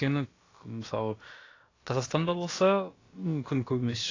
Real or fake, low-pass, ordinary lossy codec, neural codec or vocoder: fake; 7.2 kHz; AAC, 32 kbps; codec, 16 kHz, about 1 kbps, DyCAST, with the encoder's durations